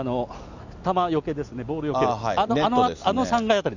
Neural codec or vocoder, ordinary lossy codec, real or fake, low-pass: none; none; real; 7.2 kHz